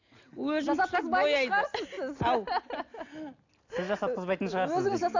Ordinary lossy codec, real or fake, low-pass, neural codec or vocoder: AAC, 48 kbps; real; 7.2 kHz; none